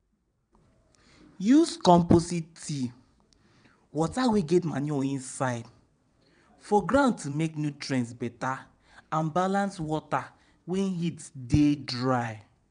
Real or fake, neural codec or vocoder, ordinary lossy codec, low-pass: fake; vocoder, 22.05 kHz, 80 mel bands, WaveNeXt; none; 9.9 kHz